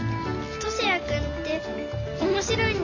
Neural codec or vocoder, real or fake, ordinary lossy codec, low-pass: none; real; none; 7.2 kHz